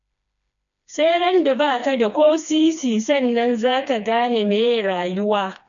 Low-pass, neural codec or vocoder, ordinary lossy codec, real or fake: 7.2 kHz; codec, 16 kHz, 2 kbps, FreqCodec, smaller model; none; fake